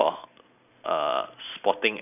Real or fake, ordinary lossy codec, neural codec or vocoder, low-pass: real; none; none; 3.6 kHz